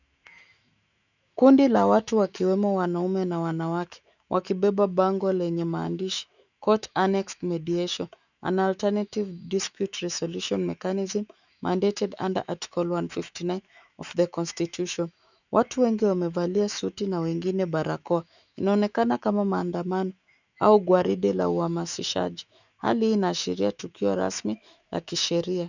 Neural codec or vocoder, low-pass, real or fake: autoencoder, 48 kHz, 128 numbers a frame, DAC-VAE, trained on Japanese speech; 7.2 kHz; fake